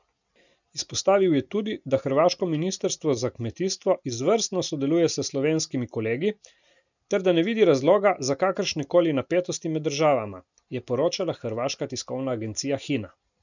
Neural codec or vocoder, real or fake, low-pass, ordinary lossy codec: none; real; 7.2 kHz; none